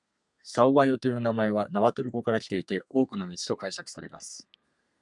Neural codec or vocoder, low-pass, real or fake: codec, 32 kHz, 1.9 kbps, SNAC; 10.8 kHz; fake